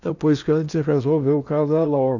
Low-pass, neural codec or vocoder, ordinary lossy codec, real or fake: 7.2 kHz; codec, 16 kHz in and 24 kHz out, 0.8 kbps, FocalCodec, streaming, 65536 codes; none; fake